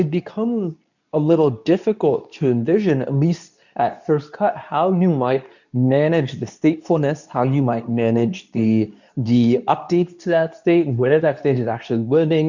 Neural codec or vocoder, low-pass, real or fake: codec, 24 kHz, 0.9 kbps, WavTokenizer, medium speech release version 2; 7.2 kHz; fake